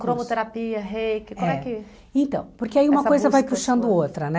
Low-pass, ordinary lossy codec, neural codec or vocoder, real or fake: none; none; none; real